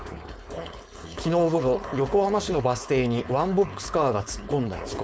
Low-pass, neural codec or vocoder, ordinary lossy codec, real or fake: none; codec, 16 kHz, 4.8 kbps, FACodec; none; fake